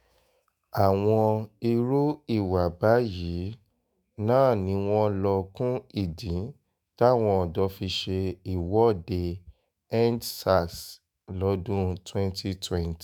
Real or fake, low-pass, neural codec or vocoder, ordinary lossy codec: fake; none; autoencoder, 48 kHz, 128 numbers a frame, DAC-VAE, trained on Japanese speech; none